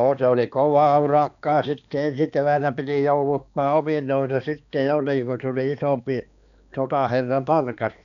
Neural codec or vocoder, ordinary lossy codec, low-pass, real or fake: codec, 16 kHz, 2 kbps, X-Codec, HuBERT features, trained on balanced general audio; none; 7.2 kHz; fake